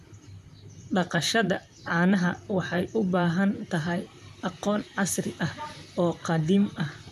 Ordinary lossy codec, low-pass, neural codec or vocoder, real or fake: none; 14.4 kHz; vocoder, 44.1 kHz, 128 mel bands, Pupu-Vocoder; fake